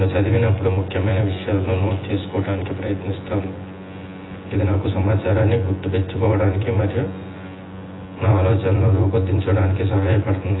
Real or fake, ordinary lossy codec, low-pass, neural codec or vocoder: fake; AAC, 16 kbps; 7.2 kHz; vocoder, 24 kHz, 100 mel bands, Vocos